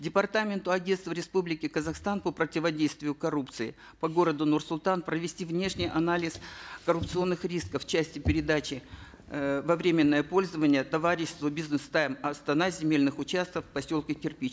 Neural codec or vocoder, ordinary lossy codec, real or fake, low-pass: none; none; real; none